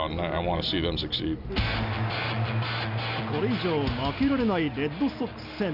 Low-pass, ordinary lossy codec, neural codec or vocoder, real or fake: 5.4 kHz; none; none; real